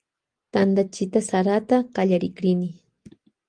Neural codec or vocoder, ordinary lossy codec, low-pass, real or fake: none; Opus, 24 kbps; 9.9 kHz; real